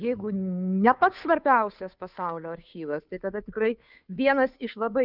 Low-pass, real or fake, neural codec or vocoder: 5.4 kHz; fake; codec, 16 kHz, 2 kbps, FunCodec, trained on Chinese and English, 25 frames a second